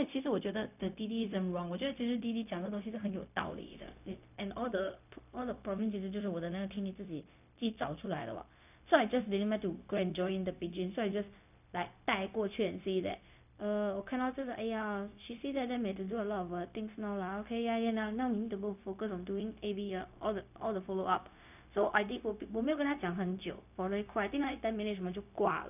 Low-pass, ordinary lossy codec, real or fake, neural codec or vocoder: 3.6 kHz; none; fake; codec, 16 kHz, 0.4 kbps, LongCat-Audio-Codec